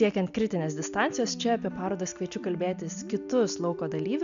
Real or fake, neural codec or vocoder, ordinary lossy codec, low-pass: real; none; MP3, 96 kbps; 7.2 kHz